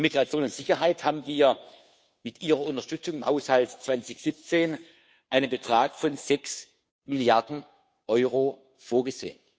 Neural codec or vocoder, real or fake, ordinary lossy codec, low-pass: codec, 16 kHz, 2 kbps, FunCodec, trained on Chinese and English, 25 frames a second; fake; none; none